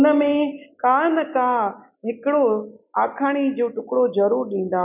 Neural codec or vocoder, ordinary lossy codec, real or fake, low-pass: none; none; real; 3.6 kHz